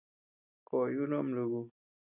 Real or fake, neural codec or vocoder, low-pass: real; none; 3.6 kHz